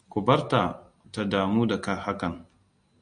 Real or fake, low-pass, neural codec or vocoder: real; 9.9 kHz; none